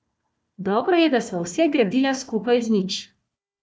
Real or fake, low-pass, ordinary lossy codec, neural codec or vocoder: fake; none; none; codec, 16 kHz, 1 kbps, FunCodec, trained on Chinese and English, 50 frames a second